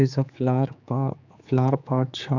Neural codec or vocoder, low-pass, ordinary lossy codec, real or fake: codec, 16 kHz, 4 kbps, X-Codec, HuBERT features, trained on general audio; 7.2 kHz; none; fake